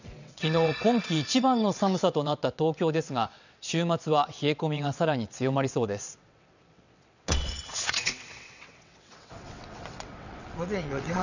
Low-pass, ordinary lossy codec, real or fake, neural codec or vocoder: 7.2 kHz; none; fake; vocoder, 22.05 kHz, 80 mel bands, WaveNeXt